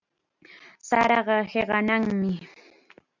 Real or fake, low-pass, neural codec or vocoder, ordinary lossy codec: real; 7.2 kHz; none; MP3, 64 kbps